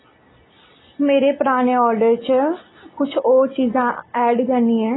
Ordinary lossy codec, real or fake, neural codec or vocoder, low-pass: AAC, 16 kbps; real; none; 7.2 kHz